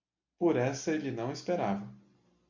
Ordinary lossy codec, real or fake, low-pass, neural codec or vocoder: MP3, 48 kbps; real; 7.2 kHz; none